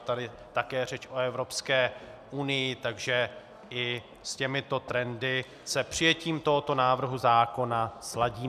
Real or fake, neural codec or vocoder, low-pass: real; none; 14.4 kHz